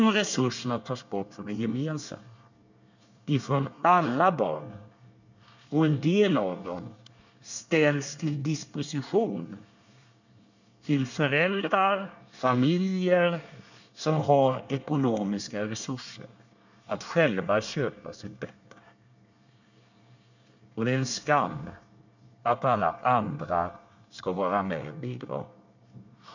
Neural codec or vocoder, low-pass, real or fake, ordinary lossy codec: codec, 24 kHz, 1 kbps, SNAC; 7.2 kHz; fake; none